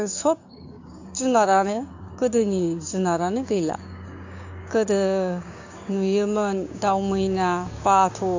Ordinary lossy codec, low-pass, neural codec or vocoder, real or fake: AAC, 48 kbps; 7.2 kHz; codec, 16 kHz, 6 kbps, DAC; fake